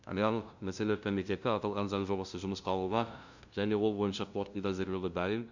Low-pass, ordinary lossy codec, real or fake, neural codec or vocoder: 7.2 kHz; none; fake; codec, 16 kHz, 0.5 kbps, FunCodec, trained on LibriTTS, 25 frames a second